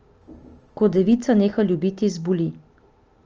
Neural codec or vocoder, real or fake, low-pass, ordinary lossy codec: none; real; 7.2 kHz; Opus, 24 kbps